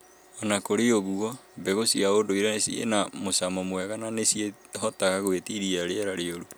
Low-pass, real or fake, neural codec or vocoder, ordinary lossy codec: none; real; none; none